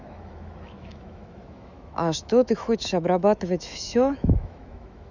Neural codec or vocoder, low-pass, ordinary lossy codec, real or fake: autoencoder, 48 kHz, 128 numbers a frame, DAC-VAE, trained on Japanese speech; 7.2 kHz; none; fake